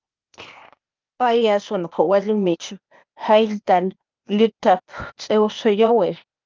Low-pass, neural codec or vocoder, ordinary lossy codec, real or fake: 7.2 kHz; codec, 16 kHz, 0.8 kbps, ZipCodec; Opus, 24 kbps; fake